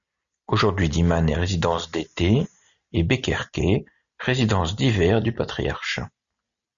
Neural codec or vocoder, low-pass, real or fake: none; 7.2 kHz; real